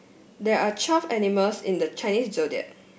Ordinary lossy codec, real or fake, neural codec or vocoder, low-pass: none; real; none; none